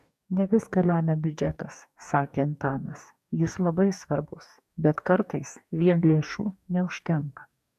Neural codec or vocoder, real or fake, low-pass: codec, 44.1 kHz, 2.6 kbps, DAC; fake; 14.4 kHz